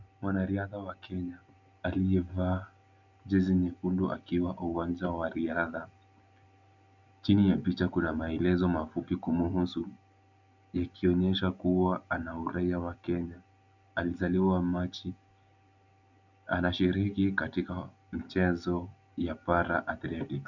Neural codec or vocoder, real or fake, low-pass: none; real; 7.2 kHz